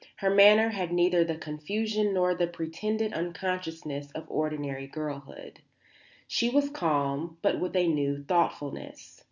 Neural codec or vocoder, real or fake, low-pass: none; real; 7.2 kHz